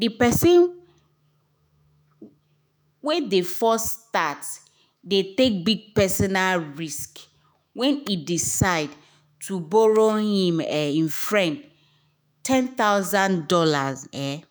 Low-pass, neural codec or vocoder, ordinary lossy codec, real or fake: none; autoencoder, 48 kHz, 128 numbers a frame, DAC-VAE, trained on Japanese speech; none; fake